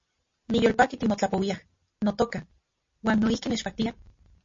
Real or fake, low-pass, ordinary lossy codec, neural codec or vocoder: real; 7.2 kHz; MP3, 32 kbps; none